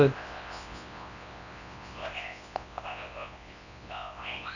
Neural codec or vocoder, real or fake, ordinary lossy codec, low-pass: codec, 24 kHz, 0.9 kbps, WavTokenizer, large speech release; fake; none; 7.2 kHz